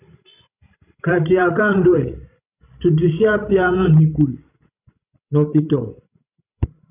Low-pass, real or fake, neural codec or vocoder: 3.6 kHz; fake; codec, 16 kHz, 16 kbps, FreqCodec, larger model